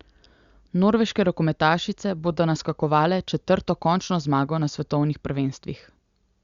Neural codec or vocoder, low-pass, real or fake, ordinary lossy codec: none; 7.2 kHz; real; Opus, 64 kbps